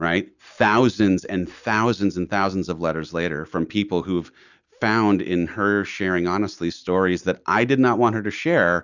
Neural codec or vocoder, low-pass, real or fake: none; 7.2 kHz; real